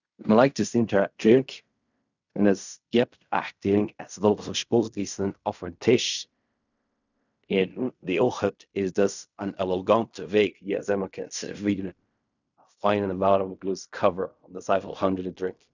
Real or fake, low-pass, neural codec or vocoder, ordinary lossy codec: fake; 7.2 kHz; codec, 16 kHz in and 24 kHz out, 0.4 kbps, LongCat-Audio-Codec, fine tuned four codebook decoder; none